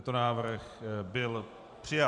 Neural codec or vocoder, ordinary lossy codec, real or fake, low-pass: vocoder, 44.1 kHz, 128 mel bands every 512 samples, BigVGAN v2; Opus, 64 kbps; fake; 10.8 kHz